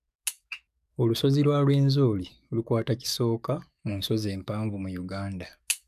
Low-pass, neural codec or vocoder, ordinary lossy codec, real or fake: 14.4 kHz; codec, 44.1 kHz, 7.8 kbps, DAC; none; fake